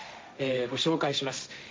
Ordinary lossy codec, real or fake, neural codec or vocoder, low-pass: none; fake; codec, 16 kHz, 1.1 kbps, Voila-Tokenizer; none